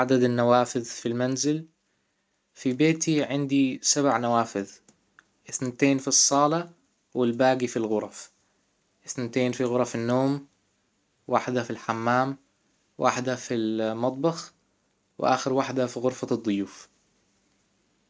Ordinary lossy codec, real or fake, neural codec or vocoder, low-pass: none; real; none; none